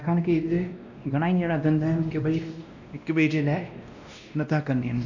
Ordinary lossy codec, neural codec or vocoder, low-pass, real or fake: none; codec, 16 kHz, 1 kbps, X-Codec, WavLM features, trained on Multilingual LibriSpeech; 7.2 kHz; fake